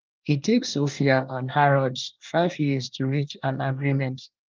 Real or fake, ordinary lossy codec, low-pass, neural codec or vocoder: fake; Opus, 24 kbps; 7.2 kHz; codec, 24 kHz, 1 kbps, SNAC